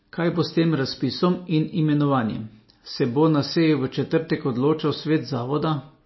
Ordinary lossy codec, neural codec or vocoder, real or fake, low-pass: MP3, 24 kbps; none; real; 7.2 kHz